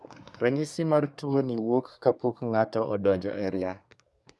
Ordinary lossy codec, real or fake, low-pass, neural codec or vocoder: none; fake; none; codec, 24 kHz, 1 kbps, SNAC